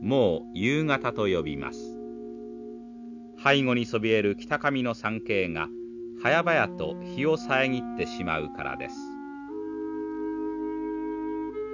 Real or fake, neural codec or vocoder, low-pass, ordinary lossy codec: real; none; 7.2 kHz; none